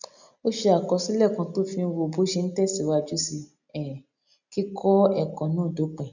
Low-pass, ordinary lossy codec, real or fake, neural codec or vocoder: 7.2 kHz; none; real; none